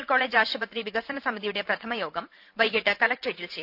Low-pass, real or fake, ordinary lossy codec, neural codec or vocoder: 5.4 kHz; fake; none; vocoder, 44.1 kHz, 128 mel bands every 512 samples, BigVGAN v2